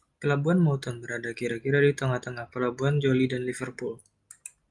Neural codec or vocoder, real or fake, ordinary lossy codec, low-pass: none; real; Opus, 32 kbps; 10.8 kHz